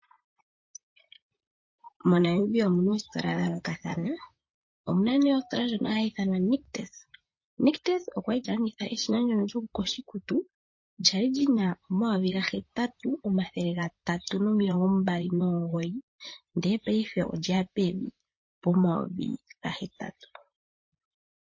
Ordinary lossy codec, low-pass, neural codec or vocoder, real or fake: MP3, 32 kbps; 7.2 kHz; vocoder, 44.1 kHz, 128 mel bands, Pupu-Vocoder; fake